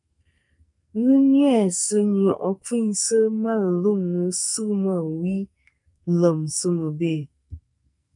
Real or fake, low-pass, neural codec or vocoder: fake; 10.8 kHz; codec, 44.1 kHz, 2.6 kbps, SNAC